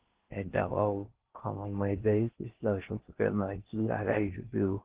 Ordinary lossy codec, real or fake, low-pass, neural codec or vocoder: Opus, 16 kbps; fake; 3.6 kHz; codec, 16 kHz in and 24 kHz out, 0.6 kbps, FocalCodec, streaming, 4096 codes